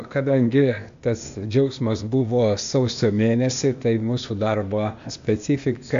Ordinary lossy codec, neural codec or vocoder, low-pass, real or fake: AAC, 64 kbps; codec, 16 kHz, 0.8 kbps, ZipCodec; 7.2 kHz; fake